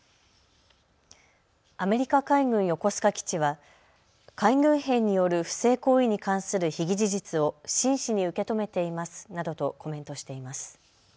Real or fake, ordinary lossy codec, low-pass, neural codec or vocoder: real; none; none; none